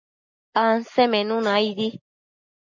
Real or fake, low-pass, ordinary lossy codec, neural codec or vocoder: real; 7.2 kHz; MP3, 64 kbps; none